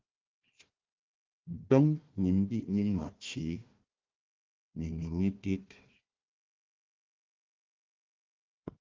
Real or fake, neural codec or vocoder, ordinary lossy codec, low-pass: fake; codec, 16 kHz, 1 kbps, FunCodec, trained on Chinese and English, 50 frames a second; Opus, 32 kbps; 7.2 kHz